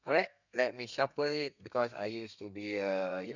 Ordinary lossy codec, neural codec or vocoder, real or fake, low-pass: none; codec, 32 kHz, 1.9 kbps, SNAC; fake; 7.2 kHz